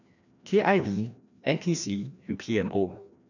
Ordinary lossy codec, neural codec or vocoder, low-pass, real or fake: none; codec, 16 kHz, 1 kbps, FreqCodec, larger model; 7.2 kHz; fake